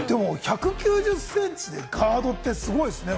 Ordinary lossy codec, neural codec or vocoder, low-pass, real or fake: none; none; none; real